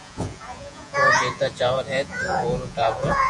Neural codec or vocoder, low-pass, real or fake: vocoder, 48 kHz, 128 mel bands, Vocos; 10.8 kHz; fake